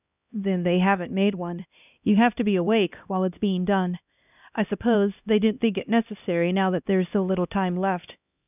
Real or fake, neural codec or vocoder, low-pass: fake; codec, 16 kHz, 1 kbps, X-Codec, HuBERT features, trained on LibriSpeech; 3.6 kHz